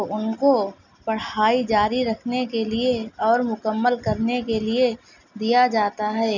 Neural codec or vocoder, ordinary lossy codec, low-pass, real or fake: none; none; 7.2 kHz; real